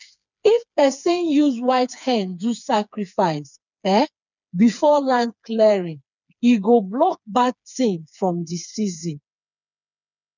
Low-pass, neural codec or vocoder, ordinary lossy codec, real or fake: 7.2 kHz; codec, 16 kHz, 4 kbps, FreqCodec, smaller model; none; fake